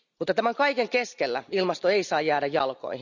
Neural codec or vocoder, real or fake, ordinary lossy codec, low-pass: none; real; none; 7.2 kHz